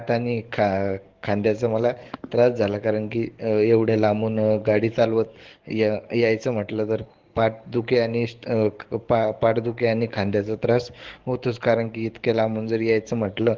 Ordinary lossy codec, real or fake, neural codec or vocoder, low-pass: Opus, 16 kbps; fake; codec, 44.1 kHz, 7.8 kbps, DAC; 7.2 kHz